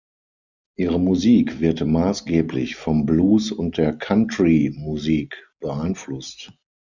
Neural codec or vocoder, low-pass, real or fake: none; 7.2 kHz; real